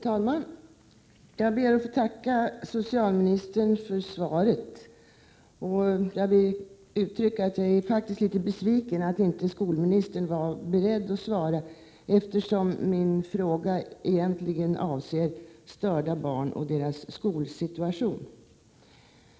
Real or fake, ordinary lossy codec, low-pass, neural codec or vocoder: real; none; none; none